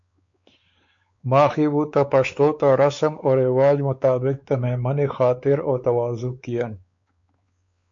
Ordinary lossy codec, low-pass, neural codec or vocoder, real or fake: MP3, 48 kbps; 7.2 kHz; codec, 16 kHz, 4 kbps, X-Codec, WavLM features, trained on Multilingual LibriSpeech; fake